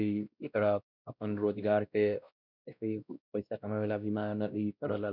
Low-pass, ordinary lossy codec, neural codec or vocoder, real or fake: 5.4 kHz; none; codec, 16 kHz, 1 kbps, X-Codec, WavLM features, trained on Multilingual LibriSpeech; fake